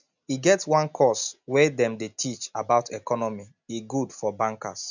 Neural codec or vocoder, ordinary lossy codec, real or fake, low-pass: none; none; real; 7.2 kHz